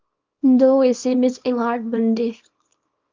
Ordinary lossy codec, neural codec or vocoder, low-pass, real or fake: Opus, 24 kbps; codec, 24 kHz, 0.9 kbps, WavTokenizer, small release; 7.2 kHz; fake